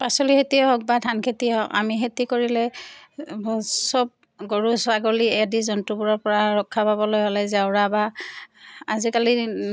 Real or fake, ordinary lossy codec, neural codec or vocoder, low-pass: real; none; none; none